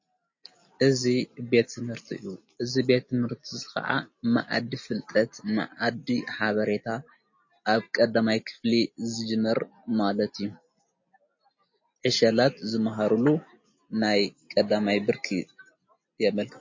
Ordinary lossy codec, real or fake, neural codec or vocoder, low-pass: MP3, 32 kbps; real; none; 7.2 kHz